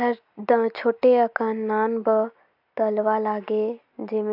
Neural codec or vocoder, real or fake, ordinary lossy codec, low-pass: none; real; AAC, 48 kbps; 5.4 kHz